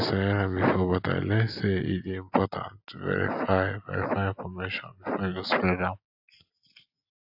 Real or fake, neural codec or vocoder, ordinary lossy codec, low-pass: real; none; AAC, 48 kbps; 5.4 kHz